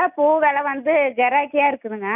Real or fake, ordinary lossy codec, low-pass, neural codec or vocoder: real; none; 3.6 kHz; none